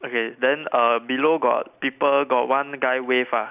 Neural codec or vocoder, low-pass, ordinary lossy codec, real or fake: none; 3.6 kHz; none; real